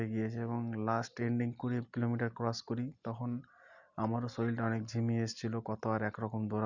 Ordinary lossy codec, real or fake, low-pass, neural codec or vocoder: Opus, 64 kbps; real; 7.2 kHz; none